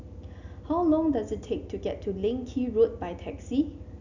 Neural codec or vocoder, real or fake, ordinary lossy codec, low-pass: none; real; none; 7.2 kHz